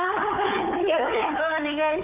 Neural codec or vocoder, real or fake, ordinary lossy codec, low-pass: codec, 16 kHz, 4 kbps, FunCodec, trained on Chinese and English, 50 frames a second; fake; none; 3.6 kHz